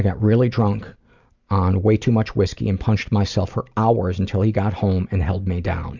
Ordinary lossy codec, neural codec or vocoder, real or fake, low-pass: Opus, 64 kbps; none; real; 7.2 kHz